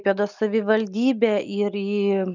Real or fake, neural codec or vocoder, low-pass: real; none; 7.2 kHz